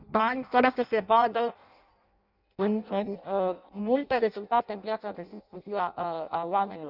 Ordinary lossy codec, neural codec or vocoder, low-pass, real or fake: none; codec, 16 kHz in and 24 kHz out, 0.6 kbps, FireRedTTS-2 codec; 5.4 kHz; fake